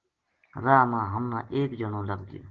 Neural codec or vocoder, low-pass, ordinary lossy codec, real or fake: none; 7.2 kHz; Opus, 16 kbps; real